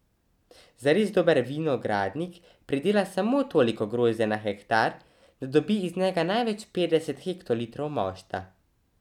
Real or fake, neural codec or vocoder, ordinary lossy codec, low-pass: real; none; none; 19.8 kHz